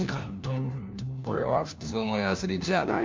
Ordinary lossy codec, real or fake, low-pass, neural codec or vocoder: MP3, 64 kbps; fake; 7.2 kHz; codec, 16 kHz, 1 kbps, FunCodec, trained on LibriTTS, 50 frames a second